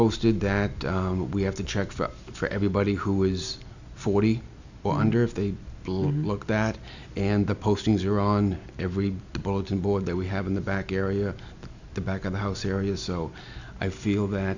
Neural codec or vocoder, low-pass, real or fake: none; 7.2 kHz; real